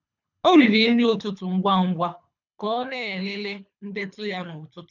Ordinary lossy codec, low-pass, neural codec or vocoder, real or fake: none; 7.2 kHz; codec, 24 kHz, 3 kbps, HILCodec; fake